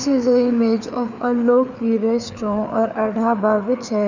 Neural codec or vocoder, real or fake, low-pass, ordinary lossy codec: codec, 16 kHz, 4 kbps, FreqCodec, larger model; fake; 7.2 kHz; none